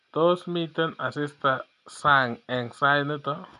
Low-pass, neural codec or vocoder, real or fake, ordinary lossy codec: 10.8 kHz; none; real; none